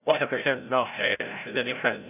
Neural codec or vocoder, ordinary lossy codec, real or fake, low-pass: codec, 16 kHz, 0.5 kbps, FreqCodec, larger model; none; fake; 3.6 kHz